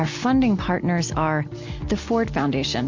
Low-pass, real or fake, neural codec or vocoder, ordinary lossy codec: 7.2 kHz; real; none; MP3, 64 kbps